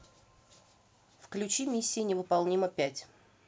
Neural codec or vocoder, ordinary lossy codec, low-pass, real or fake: none; none; none; real